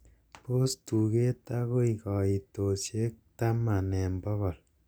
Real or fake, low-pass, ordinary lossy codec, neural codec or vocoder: real; none; none; none